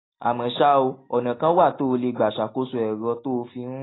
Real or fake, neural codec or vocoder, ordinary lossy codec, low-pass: real; none; AAC, 16 kbps; 7.2 kHz